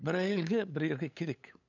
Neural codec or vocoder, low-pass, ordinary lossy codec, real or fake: codec, 16 kHz, 8 kbps, FunCodec, trained on LibriTTS, 25 frames a second; 7.2 kHz; none; fake